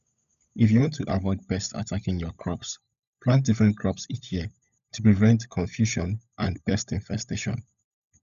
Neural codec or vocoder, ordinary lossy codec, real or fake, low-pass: codec, 16 kHz, 16 kbps, FunCodec, trained on LibriTTS, 50 frames a second; none; fake; 7.2 kHz